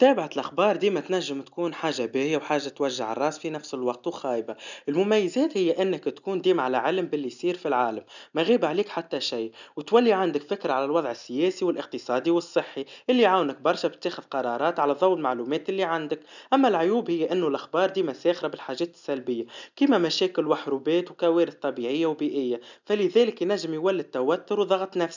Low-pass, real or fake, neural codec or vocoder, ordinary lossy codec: 7.2 kHz; real; none; none